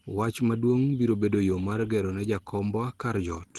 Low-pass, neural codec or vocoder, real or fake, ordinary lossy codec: 19.8 kHz; none; real; Opus, 16 kbps